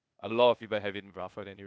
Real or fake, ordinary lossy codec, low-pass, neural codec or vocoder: fake; none; none; codec, 16 kHz, 0.8 kbps, ZipCodec